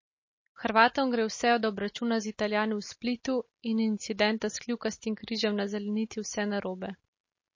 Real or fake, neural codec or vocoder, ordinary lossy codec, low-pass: real; none; MP3, 32 kbps; 7.2 kHz